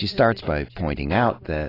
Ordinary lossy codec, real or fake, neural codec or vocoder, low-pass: AAC, 24 kbps; real; none; 5.4 kHz